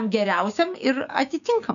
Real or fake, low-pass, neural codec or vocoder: fake; 7.2 kHz; codec, 16 kHz, 6 kbps, DAC